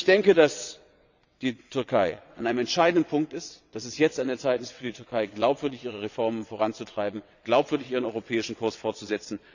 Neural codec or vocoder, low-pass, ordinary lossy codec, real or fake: vocoder, 22.05 kHz, 80 mel bands, WaveNeXt; 7.2 kHz; none; fake